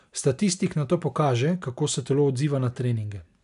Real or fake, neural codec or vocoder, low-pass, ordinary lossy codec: real; none; 10.8 kHz; none